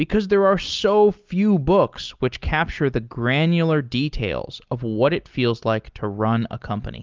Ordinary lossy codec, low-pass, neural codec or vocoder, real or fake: Opus, 32 kbps; 7.2 kHz; none; real